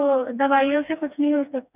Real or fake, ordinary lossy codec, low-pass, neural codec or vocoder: fake; none; 3.6 kHz; codec, 16 kHz, 2 kbps, FreqCodec, smaller model